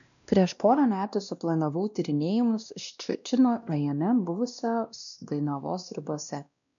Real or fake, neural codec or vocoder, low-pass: fake; codec, 16 kHz, 1 kbps, X-Codec, WavLM features, trained on Multilingual LibriSpeech; 7.2 kHz